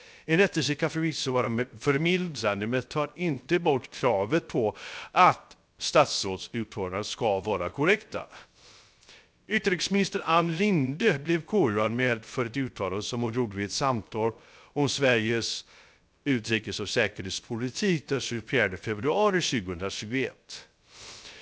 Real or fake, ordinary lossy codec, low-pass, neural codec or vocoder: fake; none; none; codec, 16 kHz, 0.3 kbps, FocalCodec